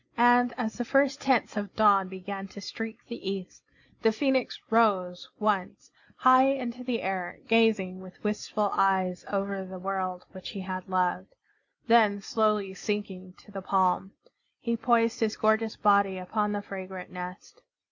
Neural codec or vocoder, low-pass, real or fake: none; 7.2 kHz; real